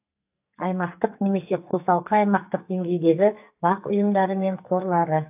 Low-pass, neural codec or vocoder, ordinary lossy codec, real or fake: 3.6 kHz; codec, 44.1 kHz, 2.6 kbps, SNAC; AAC, 32 kbps; fake